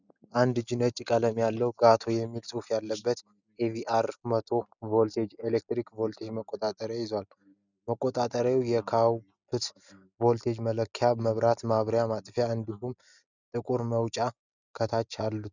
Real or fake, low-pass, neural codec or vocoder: real; 7.2 kHz; none